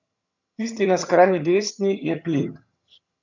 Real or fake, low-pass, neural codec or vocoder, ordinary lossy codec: fake; 7.2 kHz; vocoder, 22.05 kHz, 80 mel bands, HiFi-GAN; none